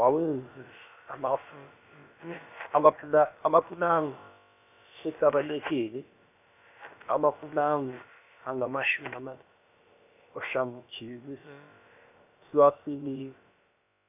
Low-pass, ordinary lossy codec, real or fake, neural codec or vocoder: 3.6 kHz; none; fake; codec, 16 kHz, about 1 kbps, DyCAST, with the encoder's durations